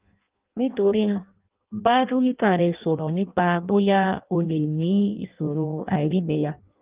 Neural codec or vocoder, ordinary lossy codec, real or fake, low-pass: codec, 16 kHz in and 24 kHz out, 0.6 kbps, FireRedTTS-2 codec; Opus, 32 kbps; fake; 3.6 kHz